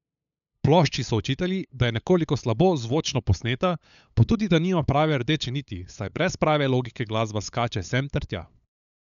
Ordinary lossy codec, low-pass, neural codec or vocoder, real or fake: none; 7.2 kHz; codec, 16 kHz, 8 kbps, FunCodec, trained on LibriTTS, 25 frames a second; fake